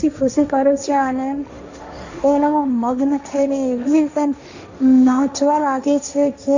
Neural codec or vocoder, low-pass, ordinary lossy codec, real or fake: codec, 16 kHz, 1.1 kbps, Voila-Tokenizer; 7.2 kHz; Opus, 64 kbps; fake